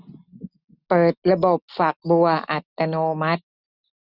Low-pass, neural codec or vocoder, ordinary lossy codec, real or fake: 5.4 kHz; none; none; real